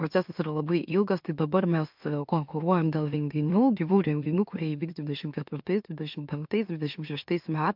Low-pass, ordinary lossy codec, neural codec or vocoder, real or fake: 5.4 kHz; MP3, 48 kbps; autoencoder, 44.1 kHz, a latent of 192 numbers a frame, MeloTTS; fake